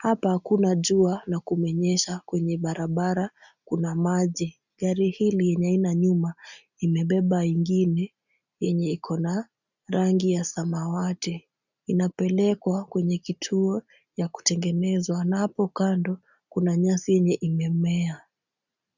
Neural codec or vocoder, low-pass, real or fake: none; 7.2 kHz; real